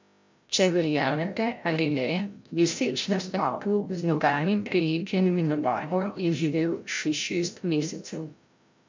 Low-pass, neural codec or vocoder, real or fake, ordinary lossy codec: 7.2 kHz; codec, 16 kHz, 0.5 kbps, FreqCodec, larger model; fake; MP3, 64 kbps